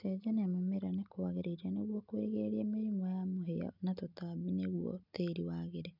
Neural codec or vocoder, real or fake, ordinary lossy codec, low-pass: none; real; none; 5.4 kHz